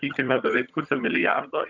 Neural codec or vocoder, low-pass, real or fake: vocoder, 22.05 kHz, 80 mel bands, HiFi-GAN; 7.2 kHz; fake